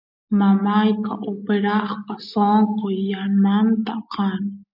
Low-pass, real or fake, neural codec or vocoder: 5.4 kHz; real; none